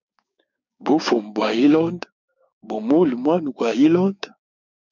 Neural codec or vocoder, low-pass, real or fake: codec, 16 kHz, 6 kbps, DAC; 7.2 kHz; fake